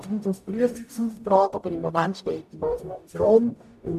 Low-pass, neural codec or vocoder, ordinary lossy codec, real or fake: 14.4 kHz; codec, 44.1 kHz, 0.9 kbps, DAC; MP3, 64 kbps; fake